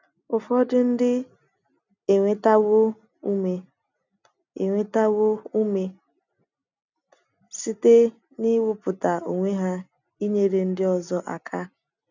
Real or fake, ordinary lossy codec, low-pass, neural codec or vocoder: real; none; 7.2 kHz; none